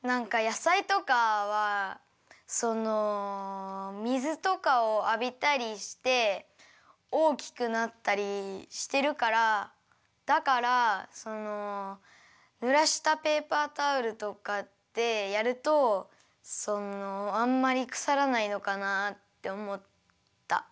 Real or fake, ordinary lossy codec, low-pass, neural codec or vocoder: real; none; none; none